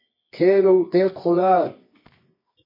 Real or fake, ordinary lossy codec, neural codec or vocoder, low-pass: fake; MP3, 24 kbps; codec, 24 kHz, 0.9 kbps, WavTokenizer, medium music audio release; 5.4 kHz